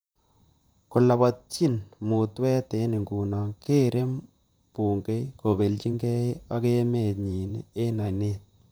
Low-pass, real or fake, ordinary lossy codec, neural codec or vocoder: none; fake; none; vocoder, 44.1 kHz, 128 mel bands, Pupu-Vocoder